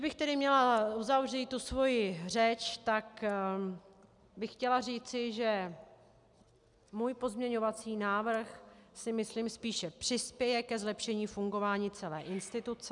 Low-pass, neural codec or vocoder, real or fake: 10.8 kHz; none; real